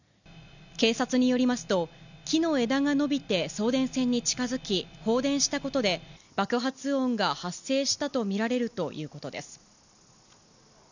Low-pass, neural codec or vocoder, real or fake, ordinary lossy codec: 7.2 kHz; none; real; none